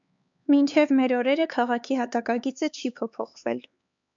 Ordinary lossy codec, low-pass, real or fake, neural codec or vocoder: MP3, 64 kbps; 7.2 kHz; fake; codec, 16 kHz, 4 kbps, X-Codec, HuBERT features, trained on LibriSpeech